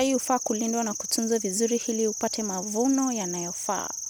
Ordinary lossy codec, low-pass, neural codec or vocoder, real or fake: none; none; none; real